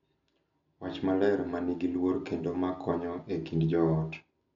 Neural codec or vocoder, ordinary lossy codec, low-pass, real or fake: none; none; 7.2 kHz; real